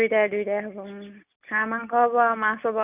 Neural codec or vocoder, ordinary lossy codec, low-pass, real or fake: none; none; 3.6 kHz; real